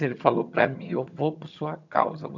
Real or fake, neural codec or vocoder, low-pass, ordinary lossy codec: fake; vocoder, 22.05 kHz, 80 mel bands, HiFi-GAN; 7.2 kHz; none